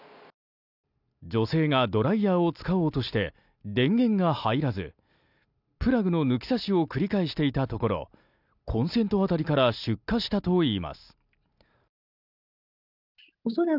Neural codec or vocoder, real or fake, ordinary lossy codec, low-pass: none; real; none; 5.4 kHz